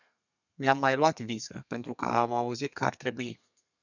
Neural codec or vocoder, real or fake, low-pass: codec, 32 kHz, 1.9 kbps, SNAC; fake; 7.2 kHz